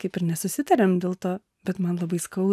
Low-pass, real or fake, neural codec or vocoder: 14.4 kHz; real; none